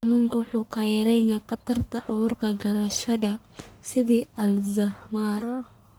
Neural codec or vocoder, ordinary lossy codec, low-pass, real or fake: codec, 44.1 kHz, 1.7 kbps, Pupu-Codec; none; none; fake